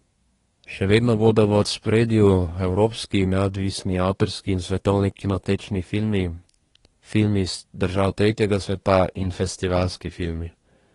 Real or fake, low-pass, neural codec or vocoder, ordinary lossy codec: fake; 10.8 kHz; codec, 24 kHz, 1 kbps, SNAC; AAC, 32 kbps